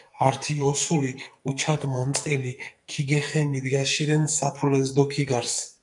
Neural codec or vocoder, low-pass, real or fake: codec, 44.1 kHz, 2.6 kbps, SNAC; 10.8 kHz; fake